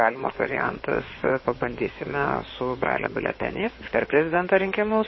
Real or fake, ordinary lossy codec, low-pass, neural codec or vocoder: fake; MP3, 24 kbps; 7.2 kHz; codec, 16 kHz in and 24 kHz out, 2.2 kbps, FireRedTTS-2 codec